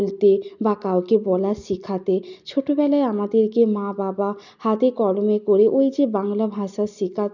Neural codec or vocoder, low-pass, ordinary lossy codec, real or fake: none; 7.2 kHz; none; real